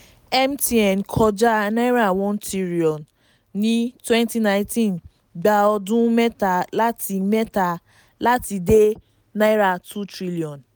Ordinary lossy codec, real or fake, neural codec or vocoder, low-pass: none; real; none; none